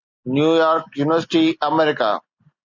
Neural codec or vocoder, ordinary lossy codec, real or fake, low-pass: none; Opus, 64 kbps; real; 7.2 kHz